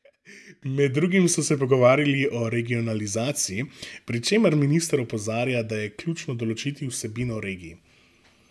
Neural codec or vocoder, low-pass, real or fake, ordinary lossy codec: none; none; real; none